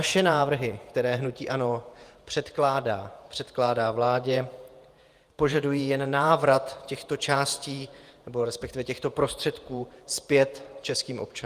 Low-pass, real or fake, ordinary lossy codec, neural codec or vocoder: 14.4 kHz; fake; Opus, 32 kbps; vocoder, 48 kHz, 128 mel bands, Vocos